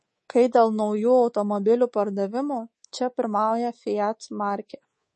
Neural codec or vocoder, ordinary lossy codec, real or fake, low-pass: codec, 24 kHz, 3.1 kbps, DualCodec; MP3, 32 kbps; fake; 10.8 kHz